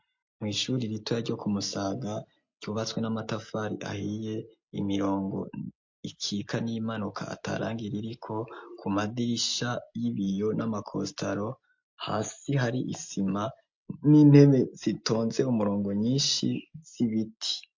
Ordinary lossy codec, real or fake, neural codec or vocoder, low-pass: MP3, 48 kbps; real; none; 7.2 kHz